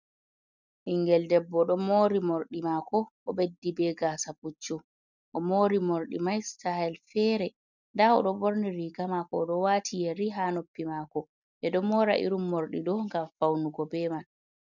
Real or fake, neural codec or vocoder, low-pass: real; none; 7.2 kHz